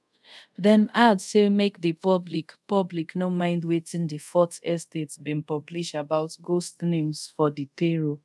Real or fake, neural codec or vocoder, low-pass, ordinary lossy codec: fake; codec, 24 kHz, 0.5 kbps, DualCodec; none; none